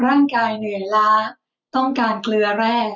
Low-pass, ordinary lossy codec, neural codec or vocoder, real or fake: 7.2 kHz; none; none; real